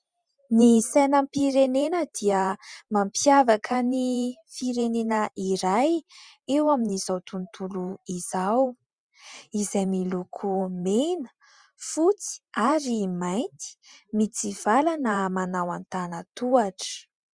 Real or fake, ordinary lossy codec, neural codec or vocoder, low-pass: fake; Opus, 64 kbps; vocoder, 44.1 kHz, 128 mel bands every 512 samples, BigVGAN v2; 9.9 kHz